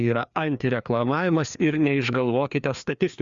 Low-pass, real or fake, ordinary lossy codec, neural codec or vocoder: 7.2 kHz; fake; Opus, 64 kbps; codec, 16 kHz, 2 kbps, FreqCodec, larger model